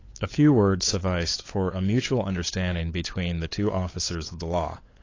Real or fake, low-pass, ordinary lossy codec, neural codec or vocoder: fake; 7.2 kHz; AAC, 32 kbps; codec, 16 kHz, 8 kbps, FunCodec, trained on LibriTTS, 25 frames a second